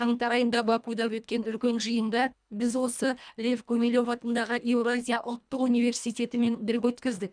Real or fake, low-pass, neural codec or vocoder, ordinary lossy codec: fake; 9.9 kHz; codec, 24 kHz, 1.5 kbps, HILCodec; none